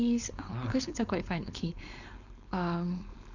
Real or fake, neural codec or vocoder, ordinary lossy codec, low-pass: fake; codec, 16 kHz, 4.8 kbps, FACodec; none; 7.2 kHz